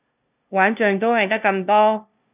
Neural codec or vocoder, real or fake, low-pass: codec, 16 kHz, 0.5 kbps, FunCodec, trained on LibriTTS, 25 frames a second; fake; 3.6 kHz